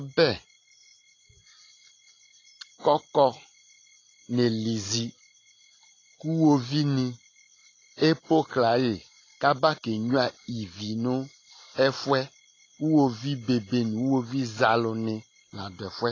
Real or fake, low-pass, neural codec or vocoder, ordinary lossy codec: real; 7.2 kHz; none; AAC, 32 kbps